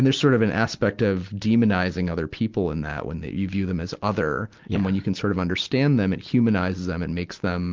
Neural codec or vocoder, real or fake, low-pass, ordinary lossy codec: none; real; 7.2 kHz; Opus, 24 kbps